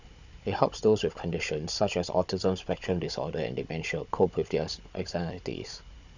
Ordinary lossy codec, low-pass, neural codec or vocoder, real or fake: none; 7.2 kHz; codec, 16 kHz, 16 kbps, FunCodec, trained on Chinese and English, 50 frames a second; fake